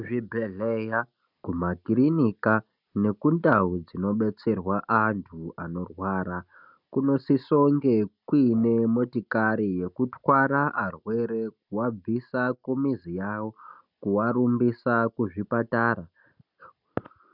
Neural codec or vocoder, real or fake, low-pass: none; real; 5.4 kHz